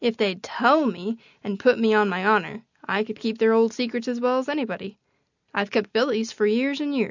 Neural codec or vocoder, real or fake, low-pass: none; real; 7.2 kHz